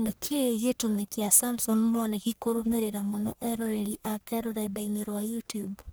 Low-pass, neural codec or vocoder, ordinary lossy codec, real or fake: none; codec, 44.1 kHz, 1.7 kbps, Pupu-Codec; none; fake